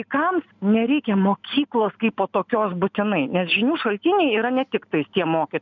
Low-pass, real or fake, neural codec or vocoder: 7.2 kHz; real; none